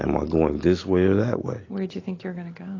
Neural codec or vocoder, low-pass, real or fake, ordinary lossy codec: none; 7.2 kHz; real; AAC, 32 kbps